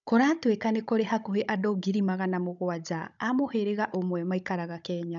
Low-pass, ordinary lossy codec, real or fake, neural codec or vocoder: 7.2 kHz; none; fake; codec, 16 kHz, 16 kbps, FunCodec, trained on Chinese and English, 50 frames a second